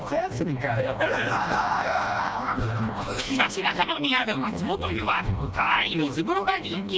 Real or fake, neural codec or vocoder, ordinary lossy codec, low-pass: fake; codec, 16 kHz, 1 kbps, FreqCodec, smaller model; none; none